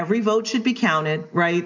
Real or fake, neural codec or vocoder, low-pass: real; none; 7.2 kHz